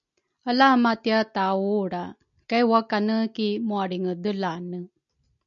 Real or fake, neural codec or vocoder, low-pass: real; none; 7.2 kHz